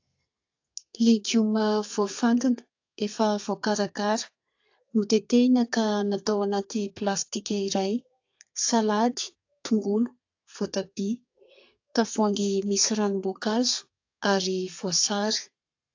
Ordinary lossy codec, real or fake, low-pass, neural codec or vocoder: AAC, 48 kbps; fake; 7.2 kHz; codec, 32 kHz, 1.9 kbps, SNAC